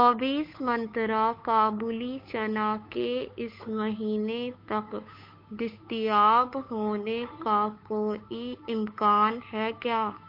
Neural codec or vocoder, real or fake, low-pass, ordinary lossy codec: codec, 16 kHz, 8 kbps, FunCodec, trained on Chinese and English, 25 frames a second; fake; 5.4 kHz; MP3, 32 kbps